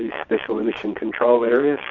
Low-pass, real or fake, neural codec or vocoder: 7.2 kHz; fake; vocoder, 22.05 kHz, 80 mel bands, Vocos